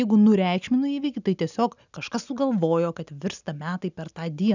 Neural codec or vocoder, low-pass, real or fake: none; 7.2 kHz; real